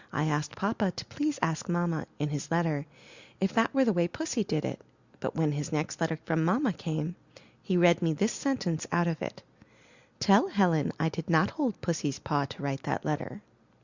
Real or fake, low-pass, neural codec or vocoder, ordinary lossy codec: real; 7.2 kHz; none; Opus, 64 kbps